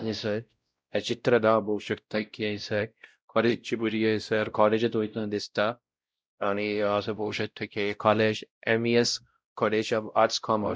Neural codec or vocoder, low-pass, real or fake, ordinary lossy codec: codec, 16 kHz, 0.5 kbps, X-Codec, WavLM features, trained on Multilingual LibriSpeech; none; fake; none